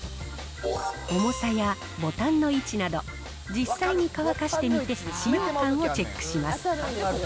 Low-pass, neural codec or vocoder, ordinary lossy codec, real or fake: none; none; none; real